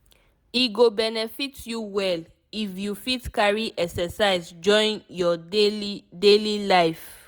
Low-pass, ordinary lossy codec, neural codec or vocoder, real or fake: none; none; none; real